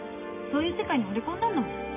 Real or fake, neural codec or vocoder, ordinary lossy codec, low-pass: real; none; none; 3.6 kHz